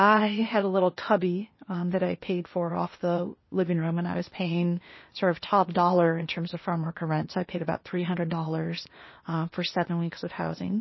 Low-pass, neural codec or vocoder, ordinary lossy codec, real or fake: 7.2 kHz; codec, 16 kHz, 0.8 kbps, ZipCodec; MP3, 24 kbps; fake